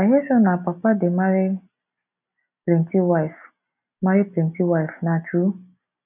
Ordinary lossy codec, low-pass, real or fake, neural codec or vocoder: none; 3.6 kHz; real; none